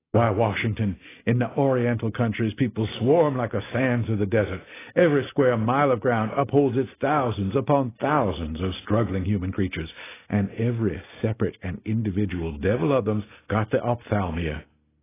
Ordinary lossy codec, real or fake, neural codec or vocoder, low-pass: AAC, 16 kbps; real; none; 3.6 kHz